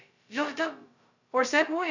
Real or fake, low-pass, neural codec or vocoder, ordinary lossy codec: fake; 7.2 kHz; codec, 16 kHz, 0.2 kbps, FocalCodec; none